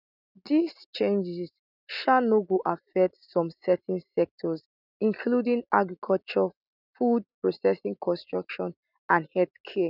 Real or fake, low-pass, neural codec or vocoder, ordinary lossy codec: real; 5.4 kHz; none; none